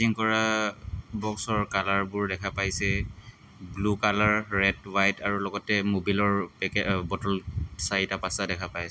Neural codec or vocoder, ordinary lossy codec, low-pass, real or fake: none; none; none; real